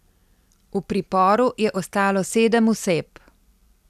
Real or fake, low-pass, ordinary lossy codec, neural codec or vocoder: real; 14.4 kHz; none; none